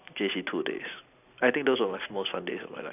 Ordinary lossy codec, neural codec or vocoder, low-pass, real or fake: none; none; 3.6 kHz; real